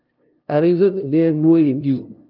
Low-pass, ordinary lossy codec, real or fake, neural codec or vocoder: 5.4 kHz; Opus, 24 kbps; fake; codec, 16 kHz, 0.5 kbps, FunCodec, trained on LibriTTS, 25 frames a second